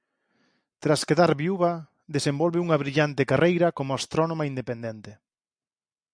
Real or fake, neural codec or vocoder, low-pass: real; none; 9.9 kHz